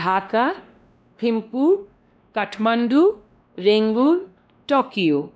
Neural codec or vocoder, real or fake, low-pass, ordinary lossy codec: codec, 16 kHz, 1 kbps, X-Codec, WavLM features, trained on Multilingual LibriSpeech; fake; none; none